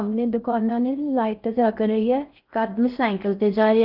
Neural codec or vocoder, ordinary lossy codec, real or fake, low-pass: codec, 16 kHz in and 24 kHz out, 0.6 kbps, FocalCodec, streaming, 4096 codes; Opus, 24 kbps; fake; 5.4 kHz